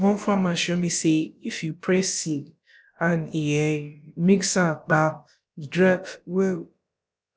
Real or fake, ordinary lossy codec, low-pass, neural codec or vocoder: fake; none; none; codec, 16 kHz, about 1 kbps, DyCAST, with the encoder's durations